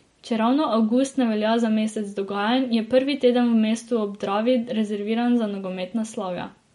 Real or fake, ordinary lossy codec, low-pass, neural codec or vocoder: real; MP3, 48 kbps; 19.8 kHz; none